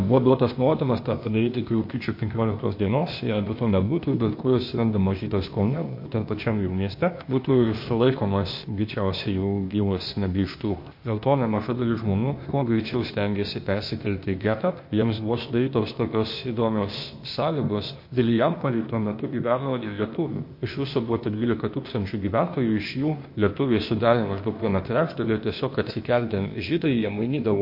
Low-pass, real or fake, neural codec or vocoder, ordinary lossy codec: 5.4 kHz; fake; codec, 16 kHz, 0.8 kbps, ZipCodec; MP3, 32 kbps